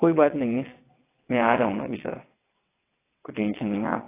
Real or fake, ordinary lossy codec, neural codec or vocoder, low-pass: fake; AAC, 16 kbps; vocoder, 22.05 kHz, 80 mel bands, WaveNeXt; 3.6 kHz